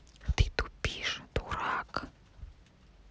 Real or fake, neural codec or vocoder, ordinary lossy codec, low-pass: real; none; none; none